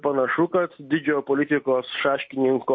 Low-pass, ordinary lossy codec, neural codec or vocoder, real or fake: 7.2 kHz; MP3, 32 kbps; none; real